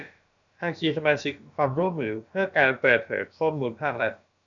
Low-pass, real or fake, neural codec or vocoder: 7.2 kHz; fake; codec, 16 kHz, about 1 kbps, DyCAST, with the encoder's durations